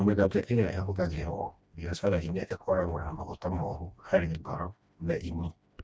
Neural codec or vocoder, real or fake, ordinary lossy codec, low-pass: codec, 16 kHz, 1 kbps, FreqCodec, smaller model; fake; none; none